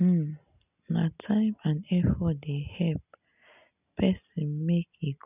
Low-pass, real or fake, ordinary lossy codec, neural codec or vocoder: 3.6 kHz; real; none; none